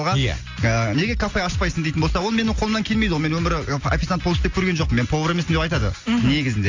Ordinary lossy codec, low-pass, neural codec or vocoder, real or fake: AAC, 48 kbps; 7.2 kHz; none; real